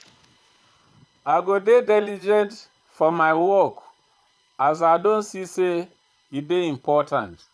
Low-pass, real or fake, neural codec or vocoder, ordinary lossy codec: none; fake; vocoder, 22.05 kHz, 80 mel bands, Vocos; none